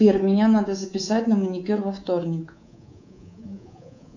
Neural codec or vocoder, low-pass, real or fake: codec, 24 kHz, 3.1 kbps, DualCodec; 7.2 kHz; fake